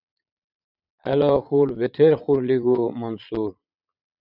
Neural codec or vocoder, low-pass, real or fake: vocoder, 22.05 kHz, 80 mel bands, Vocos; 5.4 kHz; fake